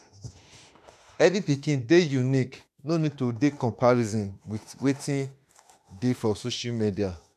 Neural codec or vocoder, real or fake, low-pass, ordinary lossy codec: autoencoder, 48 kHz, 32 numbers a frame, DAC-VAE, trained on Japanese speech; fake; 14.4 kHz; MP3, 96 kbps